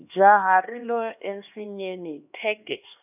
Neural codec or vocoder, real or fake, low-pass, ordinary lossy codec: codec, 16 kHz, 2 kbps, X-Codec, HuBERT features, trained on LibriSpeech; fake; 3.6 kHz; none